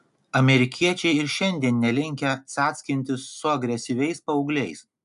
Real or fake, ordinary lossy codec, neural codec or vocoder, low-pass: real; AAC, 96 kbps; none; 10.8 kHz